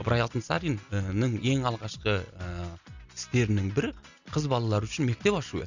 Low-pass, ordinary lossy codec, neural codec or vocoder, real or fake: 7.2 kHz; none; none; real